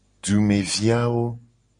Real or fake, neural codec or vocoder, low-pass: real; none; 9.9 kHz